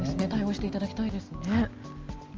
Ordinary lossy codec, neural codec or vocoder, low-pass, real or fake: Opus, 24 kbps; none; 7.2 kHz; real